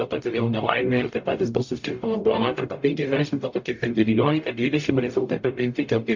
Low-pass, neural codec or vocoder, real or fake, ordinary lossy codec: 7.2 kHz; codec, 44.1 kHz, 0.9 kbps, DAC; fake; MP3, 48 kbps